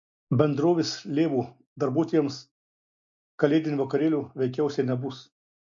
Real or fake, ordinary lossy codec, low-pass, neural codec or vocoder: real; MP3, 48 kbps; 7.2 kHz; none